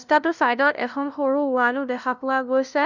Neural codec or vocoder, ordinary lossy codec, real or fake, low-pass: codec, 16 kHz, 0.5 kbps, FunCodec, trained on LibriTTS, 25 frames a second; none; fake; 7.2 kHz